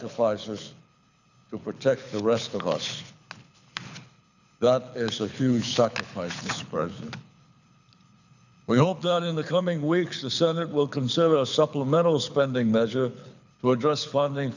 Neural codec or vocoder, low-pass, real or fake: codec, 24 kHz, 6 kbps, HILCodec; 7.2 kHz; fake